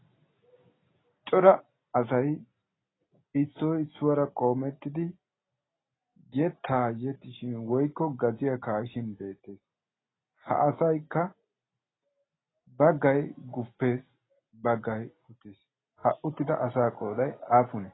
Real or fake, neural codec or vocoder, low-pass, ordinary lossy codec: real; none; 7.2 kHz; AAC, 16 kbps